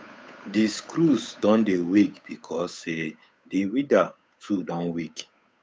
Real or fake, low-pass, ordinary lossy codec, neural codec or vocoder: fake; none; none; codec, 16 kHz, 8 kbps, FunCodec, trained on Chinese and English, 25 frames a second